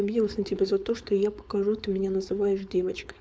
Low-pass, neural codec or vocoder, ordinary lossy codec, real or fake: none; codec, 16 kHz, 4.8 kbps, FACodec; none; fake